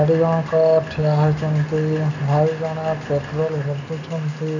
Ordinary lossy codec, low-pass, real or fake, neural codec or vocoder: none; 7.2 kHz; real; none